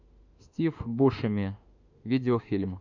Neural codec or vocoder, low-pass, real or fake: autoencoder, 48 kHz, 32 numbers a frame, DAC-VAE, trained on Japanese speech; 7.2 kHz; fake